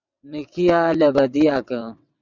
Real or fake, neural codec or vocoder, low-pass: fake; vocoder, 22.05 kHz, 80 mel bands, WaveNeXt; 7.2 kHz